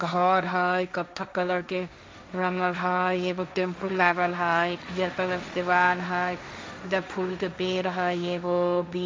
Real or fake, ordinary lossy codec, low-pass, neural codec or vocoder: fake; none; none; codec, 16 kHz, 1.1 kbps, Voila-Tokenizer